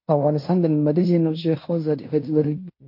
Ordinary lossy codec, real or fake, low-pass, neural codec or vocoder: MP3, 32 kbps; fake; 5.4 kHz; codec, 16 kHz in and 24 kHz out, 0.9 kbps, LongCat-Audio-Codec, fine tuned four codebook decoder